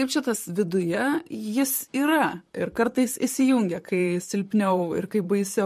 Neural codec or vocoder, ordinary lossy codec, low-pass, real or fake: vocoder, 44.1 kHz, 128 mel bands, Pupu-Vocoder; MP3, 64 kbps; 14.4 kHz; fake